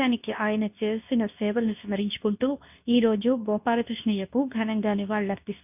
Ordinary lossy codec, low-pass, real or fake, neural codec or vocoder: none; 3.6 kHz; fake; codec, 24 kHz, 0.9 kbps, WavTokenizer, medium speech release version 2